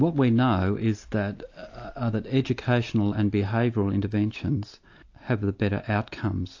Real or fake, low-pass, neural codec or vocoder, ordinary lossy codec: real; 7.2 kHz; none; AAC, 48 kbps